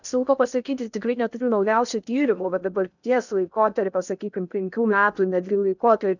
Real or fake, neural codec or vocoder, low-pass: fake; codec, 16 kHz in and 24 kHz out, 0.6 kbps, FocalCodec, streaming, 2048 codes; 7.2 kHz